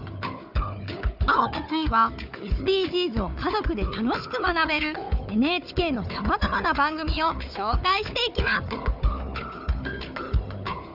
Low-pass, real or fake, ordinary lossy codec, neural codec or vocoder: 5.4 kHz; fake; none; codec, 16 kHz, 4 kbps, FunCodec, trained on Chinese and English, 50 frames a second